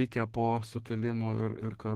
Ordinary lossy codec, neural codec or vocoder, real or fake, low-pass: Opus, 16 kbps; codec, 32 kHz, 1.9 kbps, SNAC; fake; 14.4 kHz